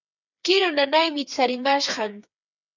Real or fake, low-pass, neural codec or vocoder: fake; 7.2 kHz; codec, 16 kHz, 4 kbps, FreqCodec, smaller model